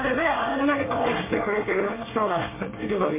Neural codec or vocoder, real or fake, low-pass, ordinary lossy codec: codec, 24 kHz, 1 kbps, SNAC; fake; 3.6 kHz; MP3, 16 kbps